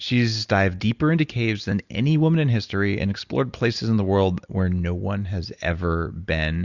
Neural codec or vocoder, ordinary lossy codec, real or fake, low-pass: none; Opus, 64 kbps; real; 7.2 kHz